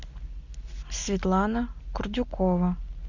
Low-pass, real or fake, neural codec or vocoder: 7.2 kHz; real; none